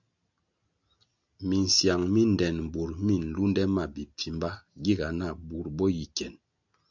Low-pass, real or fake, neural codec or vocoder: 7.2 kHz; real; none